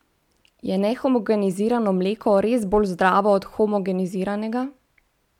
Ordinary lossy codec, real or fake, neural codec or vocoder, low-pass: MP3, 96 kbps; real; none; 19.8 kHz